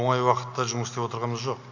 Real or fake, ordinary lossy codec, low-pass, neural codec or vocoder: real; none; 7.2 kHz; none